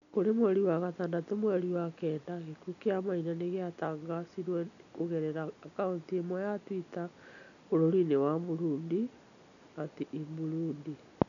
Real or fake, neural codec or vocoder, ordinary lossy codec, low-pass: real; none; none; 7.2 kHz